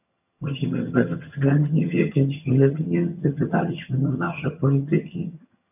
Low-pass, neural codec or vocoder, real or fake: 3.6 kHz; vocoder, 22.05 kHz, 80 mel bands, HiFi-GAN; fake